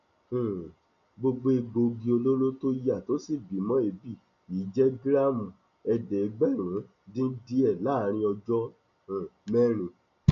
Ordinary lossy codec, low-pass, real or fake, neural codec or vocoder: none; 7.2 kHz; real; none